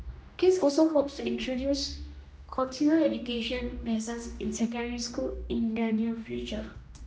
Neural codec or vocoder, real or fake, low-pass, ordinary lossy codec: codec, 16 kHz, 1 kbps, X-Codec, HuBERT features, trained on general audio; fake; none; none